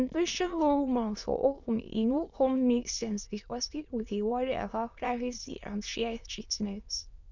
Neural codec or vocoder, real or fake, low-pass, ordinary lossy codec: autoencoder, 22.05 kHz, a latent of 192 numbers a frame, VITS, trained on many speakers; fake; 7.2 kHz; none